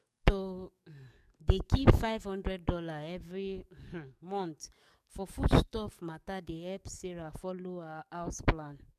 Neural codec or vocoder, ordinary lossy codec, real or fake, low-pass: codec, 44.1 kHz, 7.8 kbps, DAC; MP3, 96 kbps; fake; 14.4 kHz